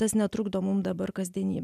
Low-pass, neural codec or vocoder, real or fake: 14.4 kHz; none; real